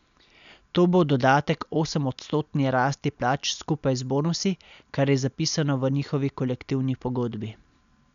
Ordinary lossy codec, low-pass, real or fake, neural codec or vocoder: none; 7.2 kHz; real; none